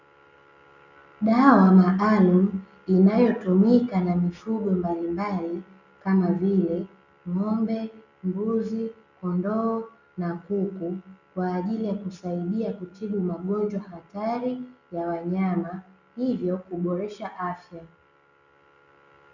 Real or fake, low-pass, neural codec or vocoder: real; 7.2 kHz; none